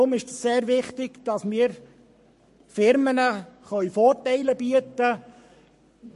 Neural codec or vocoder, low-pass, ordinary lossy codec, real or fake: codec, 44.1 kHz, 7.8 kbps, Pupu-Codec; 14.4 kHz; MP3, 48 kbps; fake